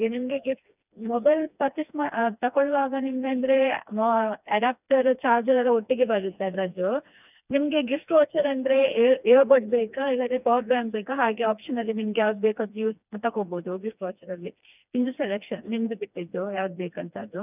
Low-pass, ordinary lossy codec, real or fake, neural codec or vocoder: 3.6 kHz; none; fake; codec, 16 kHz, 2 kbps, FreqCodec, smaller model